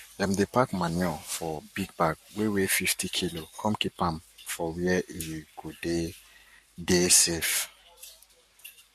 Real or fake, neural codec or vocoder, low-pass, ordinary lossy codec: fake; codec, 44.1 kHz, 7.8 kbps, Pupu-Codec; 14.4 kHz; MP3, 64 kbps